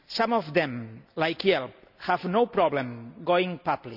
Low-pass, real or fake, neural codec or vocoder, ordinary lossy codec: 5.4 kHz; real; none; none